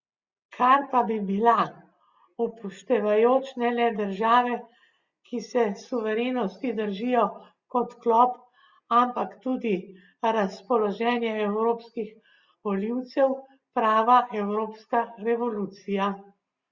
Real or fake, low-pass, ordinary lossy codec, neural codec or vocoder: real; 7.2 kHz; Opus, 64 kbps; none